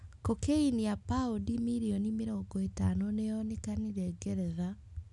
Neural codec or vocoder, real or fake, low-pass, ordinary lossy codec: none; real; 10.8 kHz; none